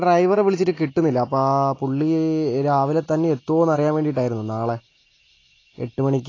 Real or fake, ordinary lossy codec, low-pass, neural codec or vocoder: real; AAC, 32 kbps; 7.2 kHz; none